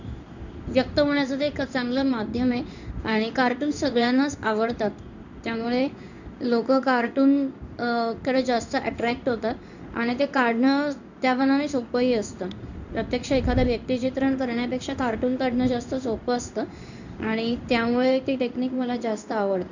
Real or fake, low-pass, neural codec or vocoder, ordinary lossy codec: fake; 7.2 kHz; codec, 16 kHz in and 24 kHz out, 1 kbps, XY-Tokenizer; none